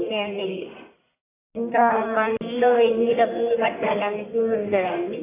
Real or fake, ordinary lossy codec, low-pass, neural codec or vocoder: fake; AAC, 16 kbps; 3.6 kHz; codec, 44.1 kHz, 1.7 kbps, Pupu-Codec